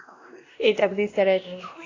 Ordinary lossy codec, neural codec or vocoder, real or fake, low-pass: AAC, 32 kbps; codec, 16 kHz, 1 kbps, X-Codec, WavLM features, trained on Multilingual LibriSpeech; fake; 7.2 kHz